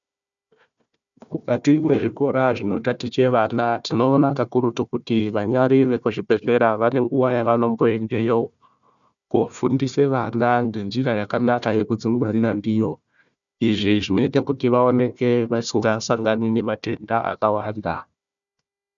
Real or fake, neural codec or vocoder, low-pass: fake; codec, 16 kHz, 1 kbps, FunCodec, trained on Chinese and English, 50 frames a second; 7.2 kHz